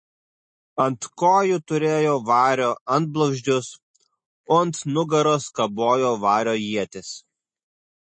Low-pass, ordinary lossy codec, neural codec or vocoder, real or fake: 9.9 kHz; MP3, 32 kbps; none; real